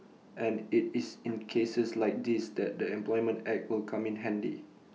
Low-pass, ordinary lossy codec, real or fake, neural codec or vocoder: none; none; real; none